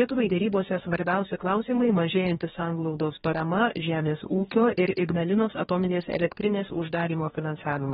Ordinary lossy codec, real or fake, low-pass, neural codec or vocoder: AAC, 16 kbps; fake; 19.8 kHz; codec, 44.1 kHz, 2.6 kbps, DAC